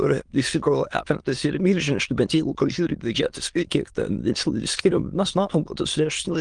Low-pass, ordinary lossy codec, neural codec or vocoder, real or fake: 9.9 kHz; Opus, 24 kbps; autoencoder, 22.05 kHz, a latent of 192 numbers a frame, VITS, trained on many speakers; fake